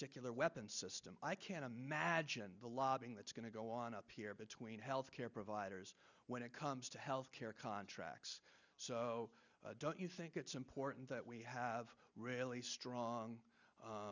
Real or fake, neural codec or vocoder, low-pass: real; none; 7.2 kHz